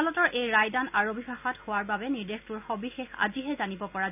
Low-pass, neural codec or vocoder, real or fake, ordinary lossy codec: 3.6 kHz; none; real; none